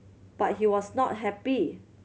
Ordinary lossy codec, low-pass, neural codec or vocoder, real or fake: none; none; none; real